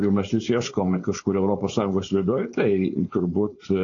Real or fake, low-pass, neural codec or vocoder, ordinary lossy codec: fake; 7.2 kHz; codec, 16 kHz, 4.8 kbps, FACodec; AAC, 32 kbps